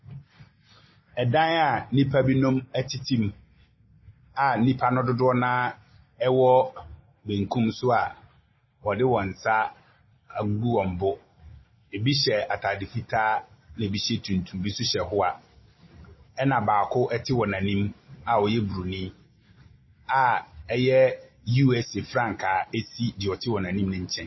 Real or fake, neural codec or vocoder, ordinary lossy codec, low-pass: real; none; MP3, 24 kbps; 7.2 kHz